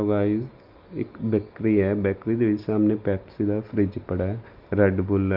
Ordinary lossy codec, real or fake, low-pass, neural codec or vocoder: Opus, 24 kbps; real; 5.4 kHz; none